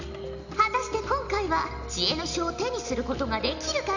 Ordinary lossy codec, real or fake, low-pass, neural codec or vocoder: none; real; 7.2 kHz; none